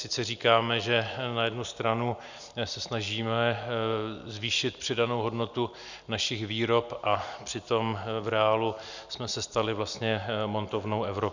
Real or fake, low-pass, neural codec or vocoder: real; 7.2 kHz; none